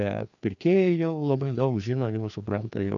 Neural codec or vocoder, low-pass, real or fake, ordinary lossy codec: codec, 16 kHz, 2 kbps, FreqCodec, larger model; 7.2 kHz; fake; AAC, 64 kbps